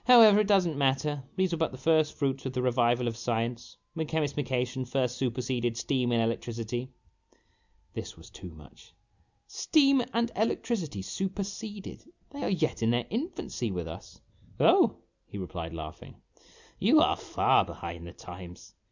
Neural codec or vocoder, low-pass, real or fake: none; 7.2 kHz; real